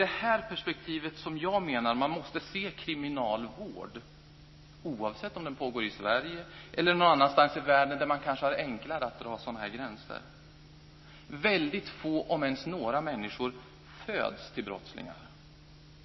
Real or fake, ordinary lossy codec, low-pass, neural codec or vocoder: real; MP3, 24 kbps; 7.2 kHz; none